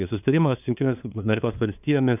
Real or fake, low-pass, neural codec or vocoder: fake; 3.6 kHz; codec, 16 kHz, 2 kbps, FunCodec, trained on LibriTTS, 25 frames a second